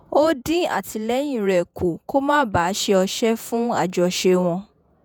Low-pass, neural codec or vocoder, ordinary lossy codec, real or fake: none; vocoder, 48 kHz, 128 mel bands, Vocos; none; fake